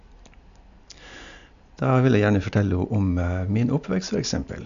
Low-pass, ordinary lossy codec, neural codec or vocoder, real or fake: 7.2 kHz; AAC, 96 kbps; none; real